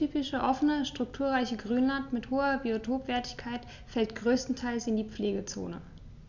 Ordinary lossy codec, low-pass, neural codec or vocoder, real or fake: none; 7.2 kHz; none; real